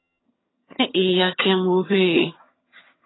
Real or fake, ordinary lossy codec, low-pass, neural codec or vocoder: fake; AAC, 16 kbps; 7.2 kHz; vocoder, 22.05 kHz, 80 mel bands, HiFi-GAN